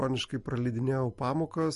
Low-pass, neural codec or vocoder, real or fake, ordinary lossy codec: 14.4 kHz; none; real; MP3, 48 kbps